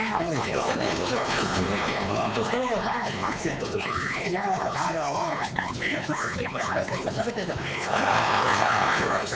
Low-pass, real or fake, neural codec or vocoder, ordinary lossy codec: none; fake; codec, 16 kHz, 2 kbps, X-Codec, WavLM features, trained on Multilingual LibriSpeech; none